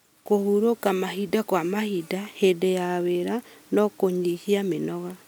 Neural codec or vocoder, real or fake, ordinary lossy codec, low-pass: none; real; none; none